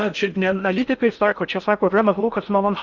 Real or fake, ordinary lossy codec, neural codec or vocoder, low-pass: fake; Opus, 64 kbps; codec, 16 kHz in and 24 kHz out, 0.6 kbps, FocalCodec, streaming, 4096 codes; 7.2 kHz